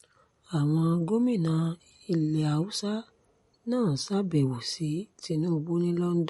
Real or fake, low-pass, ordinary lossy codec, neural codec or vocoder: real; 19.8 kHz; MP3, 48 kbps; none